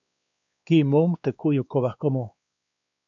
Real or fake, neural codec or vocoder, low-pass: fake; codec, 16 kHz, 2 kbps, X-Codec, WavLM features, trained on Multilingual LibriSpeech; 7.2 kHz